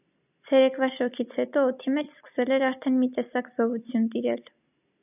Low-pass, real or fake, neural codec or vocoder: 3.6 kHz; real; none